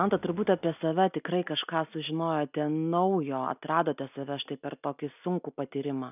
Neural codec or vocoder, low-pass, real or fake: none; 3.6 kHz; real